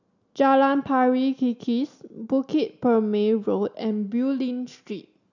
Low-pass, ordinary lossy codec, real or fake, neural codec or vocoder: 7.2 kHz; none; real; none